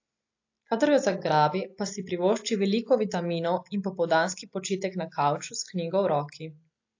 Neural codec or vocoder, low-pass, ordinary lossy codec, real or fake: none; 7.2 kHz; AAC, 48 kbps; real